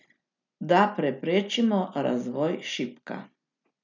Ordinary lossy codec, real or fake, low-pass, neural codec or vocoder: none; real; 7.2 kHz; none